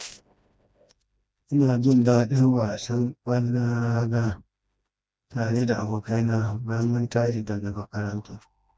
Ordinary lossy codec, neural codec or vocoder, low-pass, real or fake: none; codec, 16 kHz, 1 kbps, FreqCodec, smaller model; none; fake